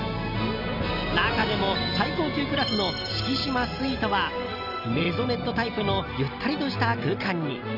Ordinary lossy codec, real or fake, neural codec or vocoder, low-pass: none; real; none; 5.4 kHz